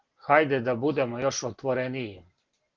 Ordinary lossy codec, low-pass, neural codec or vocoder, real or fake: Opus, 16 kbps; 7.2 kHz; none; real